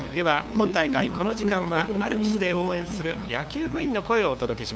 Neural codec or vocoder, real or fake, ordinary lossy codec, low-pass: codec, 16 kHz, 2 kbps, FunCodec, trained on LibriTTS, 25 frames a second; fake; none; none